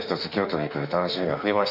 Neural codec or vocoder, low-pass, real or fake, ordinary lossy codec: autoencoder, 48 kHz, 32 numbers a frame, DAC-VAE, trained on Japanese speech; 5.4 kHz; fake; none